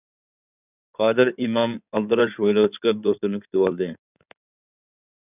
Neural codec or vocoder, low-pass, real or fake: codec, 16 kHz in and 24 kHz out, 2.2 kbps, FireRedTTS-2 codec; 3.6 kHz; fake